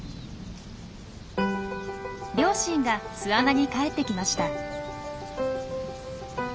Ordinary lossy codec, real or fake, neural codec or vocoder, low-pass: none; real; none; none